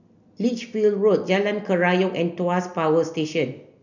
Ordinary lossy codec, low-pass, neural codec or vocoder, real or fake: none; 7.2 kHz; none; real